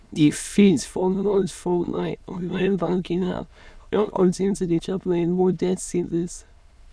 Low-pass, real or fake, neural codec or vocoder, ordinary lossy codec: none; fake; autoencoder, 22.05 kHz, a latent of 192 numbers a frame, VITS, trained on many speakers; none